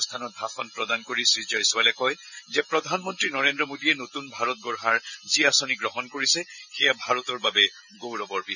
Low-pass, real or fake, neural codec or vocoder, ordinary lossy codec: 7.2 kHz; real; none; none